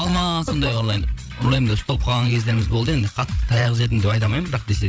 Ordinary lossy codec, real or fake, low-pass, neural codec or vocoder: none; fake; none; codec, 16 kHz, 8 kbps, FreqCodec, larger model